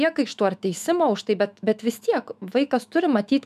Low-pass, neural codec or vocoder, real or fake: 14.4 kHz; autoencoder, 48 kHz, 128 numbers a frame, DAC-VAE, trained on Japanese speech; fake